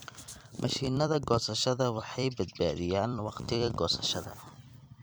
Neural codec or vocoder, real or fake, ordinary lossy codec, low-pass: vocoder, 44.1 kHz, 128 mel bands every 256 samples, BigVGAN v2; fake; none; none